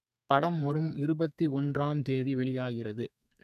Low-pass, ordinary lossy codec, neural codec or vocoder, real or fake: 14.4 kHz; none; codec, 32 kHz, 1.9 kbps, SNAC; fake